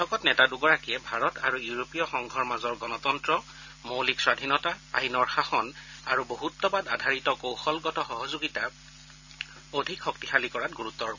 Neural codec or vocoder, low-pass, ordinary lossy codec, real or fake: none; 7.2 kHz; none; real